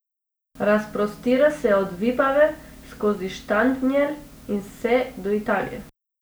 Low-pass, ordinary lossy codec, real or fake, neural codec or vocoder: none; none; real; none